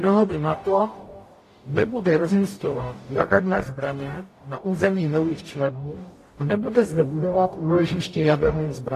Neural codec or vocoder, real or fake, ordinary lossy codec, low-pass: codec, 44.1 kHz, 0.9 kbps, DAC; fake; AAC, 48 kbps; 14.4 kHz